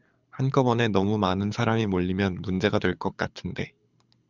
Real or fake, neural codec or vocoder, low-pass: fake; codec, 24 kHz, 6 kbps, HILCodec; 7.2 kHz